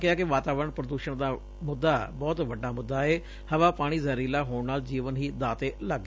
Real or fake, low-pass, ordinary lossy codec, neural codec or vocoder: real; none; none; none